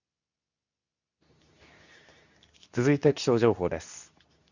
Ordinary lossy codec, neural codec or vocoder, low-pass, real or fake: none; codec, 24 kHz, 0.9 kbps, WavTokenizer, medium speech release version 2; 7.2 kHz; fake